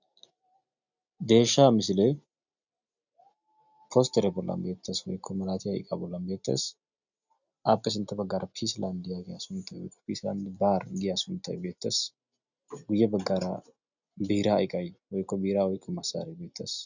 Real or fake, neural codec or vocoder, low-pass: real; none; 7.2 kHz